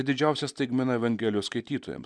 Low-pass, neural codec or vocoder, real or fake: 9.9 kHz; none; real